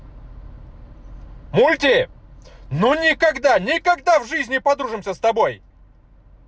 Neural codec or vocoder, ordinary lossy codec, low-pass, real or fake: none; none; none; real